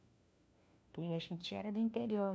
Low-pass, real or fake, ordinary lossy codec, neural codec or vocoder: none; fake; none; codec, 16 kHz, 1 kbps, FunCodec, trained on LibriTTS, 50 frames a second